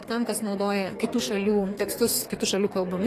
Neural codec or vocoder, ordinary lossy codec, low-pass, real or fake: codec, 32 kHz, 1.9 kbps, SNAC; AAC, 48 kbps; 14.4 kHz; fake